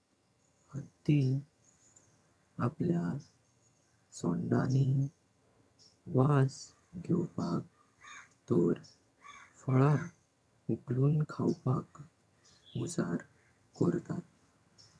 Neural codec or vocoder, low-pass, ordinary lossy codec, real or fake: vocoder, 22.05 kHz, 80 mel bands, HiFi-GAN; none; none; fake